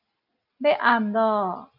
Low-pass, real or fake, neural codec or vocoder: 5.4 kHz; real; none